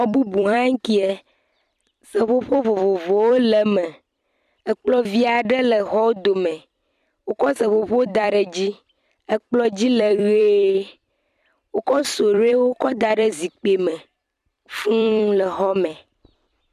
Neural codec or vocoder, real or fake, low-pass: vocoder, 44.1 kHz, 128 mel bands every 256 samples, BigVGAN v2; fake; 14.4 kHz